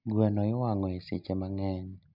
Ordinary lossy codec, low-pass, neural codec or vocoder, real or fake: none; 5.4 kHz; none; real